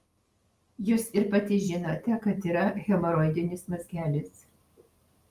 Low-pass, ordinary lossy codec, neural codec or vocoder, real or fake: 14.4 kHz; Opus, 32 kbps; none; real